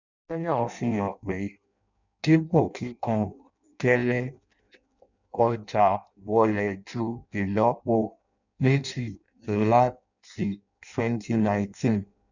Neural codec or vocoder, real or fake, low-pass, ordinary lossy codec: codec, 16 kHz in and 24 kHz out, 0.6 kbps, FireRedTTS-2 codec; fake; 7.2 kHz; none